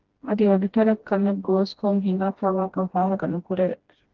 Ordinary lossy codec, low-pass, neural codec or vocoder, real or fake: Opus, 16 kbps; 7.2 kHz; codec, 16 kHz, 1 kbps, FreqCodec, smaller model; fake